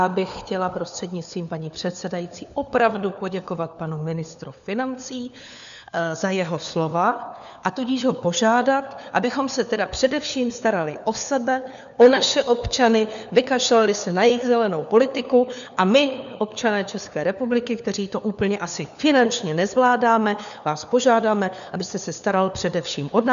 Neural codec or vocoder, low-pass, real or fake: codec, 16 kHz, 4 kbps, FunCodec, trained on LibriTTS, 50 frames a second; 7.2 kHz; fake